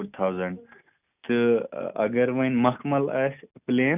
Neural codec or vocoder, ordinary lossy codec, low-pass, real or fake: none; none; 3.6 kHz; real